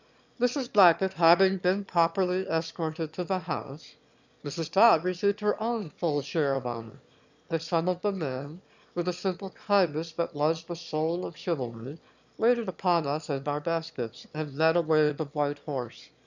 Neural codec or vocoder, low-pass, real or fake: autoencoder, 22.05 kHz, a latent of 192 numbers a frame, VITS, trained on one speaker; 7.2 kHz; fake